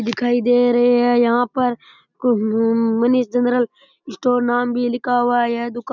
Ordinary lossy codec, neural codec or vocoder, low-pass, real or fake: none; none; 7.2 kHz; real